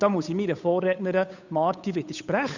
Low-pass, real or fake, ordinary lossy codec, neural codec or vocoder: 7.2 kHz; fake; none; codec, 16 kHz, 8 kbps, FunCodec, trained on Chinese and English, 25 frames a second